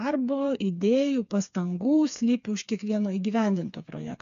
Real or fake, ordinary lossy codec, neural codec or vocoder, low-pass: fake; AAC, 96 kbps; codec, 16 kHz, 4 kbps, FreqCodec, smaller model; 7.2 kHz